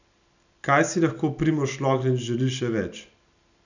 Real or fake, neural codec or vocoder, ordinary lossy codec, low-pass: real; none; none; 7.2 kHz